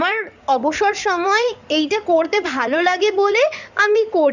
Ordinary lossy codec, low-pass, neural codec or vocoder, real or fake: none; 7.2 kHz; codec, 16 kHz in and 24 kHz out, 2.2 kbps, FireRedTTS-2 codec; fake